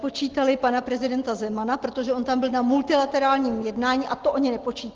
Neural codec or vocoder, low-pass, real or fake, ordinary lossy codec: none; 7.2 kHz; real; Opus, 16 kbps